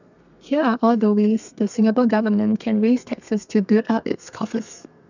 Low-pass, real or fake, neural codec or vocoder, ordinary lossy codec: 7.2 kHz; fake; codec, 32 kHz, 1.9 kbps, SNAC; none